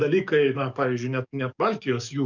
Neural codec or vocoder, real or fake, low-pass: codec, 44.1 kHz, 7.8 kbps, DAC; fake; 7.2 kHz